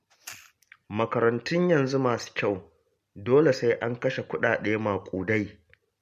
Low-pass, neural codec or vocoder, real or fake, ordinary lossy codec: 14.4 kHz; none; real; MP3, 64 kbps